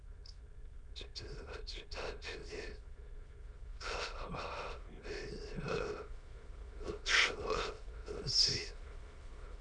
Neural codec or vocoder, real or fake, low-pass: autoencoder, 22.05 kHz, a latent of 192 numbers a frame, VITS, trained on many speakers; fake; 9.9 kHz